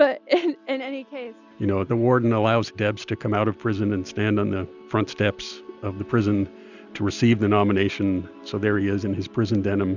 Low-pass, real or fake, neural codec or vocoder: 7.2 kHz; real; none